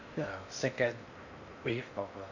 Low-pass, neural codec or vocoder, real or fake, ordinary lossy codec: 7.2 kHz; codec, 16 kHz in and 24 kHz out, 0.8 kbps, FocalCodec, streaming, 65536 codes; fake; none